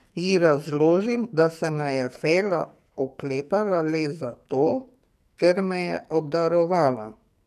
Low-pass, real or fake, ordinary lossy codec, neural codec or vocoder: 14.4 kHz; fake; none; codec, 44.1 kHz, 2.6 kbps, SNAC